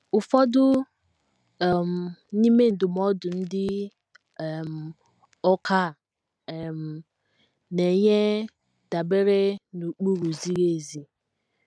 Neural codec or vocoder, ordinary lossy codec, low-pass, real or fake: none; none; none; real